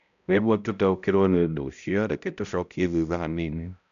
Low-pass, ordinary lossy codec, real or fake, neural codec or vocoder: 7.2 kHz; none; fake; codec, 16 kHz, 0.5 kbps, X-Codec, HuBERT features, trained on balanced general audio